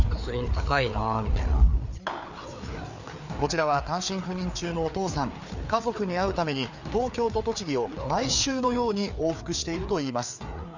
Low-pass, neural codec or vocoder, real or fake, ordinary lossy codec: 7.2 kHz; codec, 16 kHz, 4 kbps, FreqCodec, larger model; fake; none